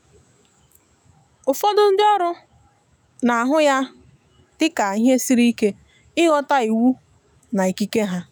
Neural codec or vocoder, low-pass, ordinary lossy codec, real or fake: autoencoder, 48 kHz, 128 numbers a frame, DAC-VAE, trained on Japanese speech; none; none; fake